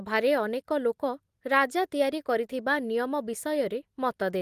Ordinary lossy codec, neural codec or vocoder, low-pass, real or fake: Opus, 24 kbps; none; 14.4 kHz; real